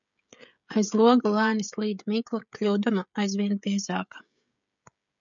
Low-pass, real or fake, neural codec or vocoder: 7.2 kHz; fake; codec, 16 kHz, 16 kbps, FreqCodec, smaller model